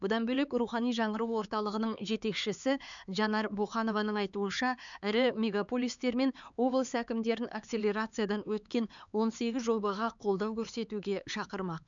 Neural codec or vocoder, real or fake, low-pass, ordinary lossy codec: codec, 16 kHz, 4 kbps, X-Codec, HuBERT features, trained on LibriSpeech; fake; 7.2 kHz; none